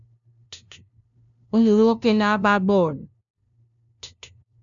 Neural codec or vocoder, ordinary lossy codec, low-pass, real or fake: codec, 16 kHz, 0.5 kbps, FunCodec, trained on LibriTTS, 25 frames a second; MP3, 96 kbps; 7.2 kHz; fake